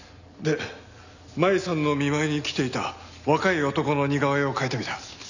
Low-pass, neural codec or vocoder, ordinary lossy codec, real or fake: 7.2 kHz; none; none; real